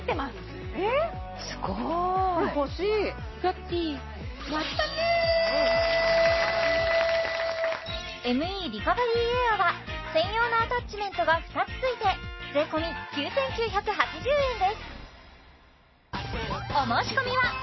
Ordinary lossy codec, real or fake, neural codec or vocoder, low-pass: MP3, 24 kbps; real; none; 7.2 kHz